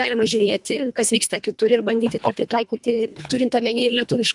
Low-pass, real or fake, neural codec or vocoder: 10.8 kHz; fake; codec, 24 kHz, 1.5 kbps, HILCodec